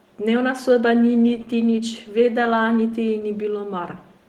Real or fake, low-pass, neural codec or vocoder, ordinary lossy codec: real; 19.8 kHz; none; Opus, 16 kbps